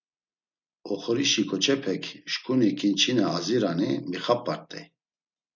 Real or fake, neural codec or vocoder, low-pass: real; none; 7.2 kHz